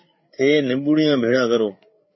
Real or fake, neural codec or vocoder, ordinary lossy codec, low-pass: fake; codec, 16 kHz, 8 kbps, FreqCodec, larger model; MP3, 24 kbps; 7.2 kHz